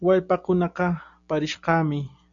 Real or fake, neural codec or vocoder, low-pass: real; none; 7.2 kHz